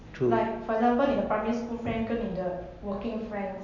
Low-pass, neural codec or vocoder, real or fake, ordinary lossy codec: 7.2 kHz; none; real; none